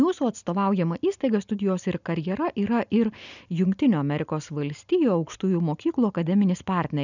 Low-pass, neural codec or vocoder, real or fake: 7.2 kHz; none; real